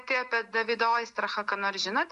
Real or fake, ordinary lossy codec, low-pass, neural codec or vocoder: real; AAC, 96 kbps; 10.8 kHz; none